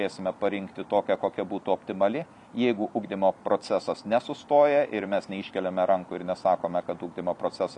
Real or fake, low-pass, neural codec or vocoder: real; 10.8 kHz; none